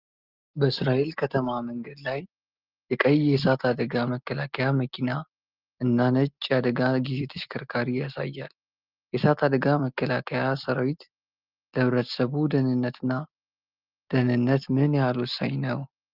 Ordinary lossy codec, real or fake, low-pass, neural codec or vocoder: Opus, 32 kbps; real; 5.4 kHz; none